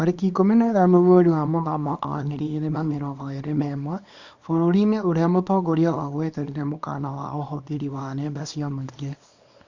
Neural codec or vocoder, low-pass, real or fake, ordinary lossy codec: codec, 24 kHz, 0.9 kbps, WavTokenizer, small release; 7.2 kHz; fake; Opus, 64 kbps